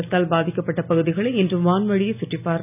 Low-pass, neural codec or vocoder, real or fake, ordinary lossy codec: 3.6 kHz; none; real; none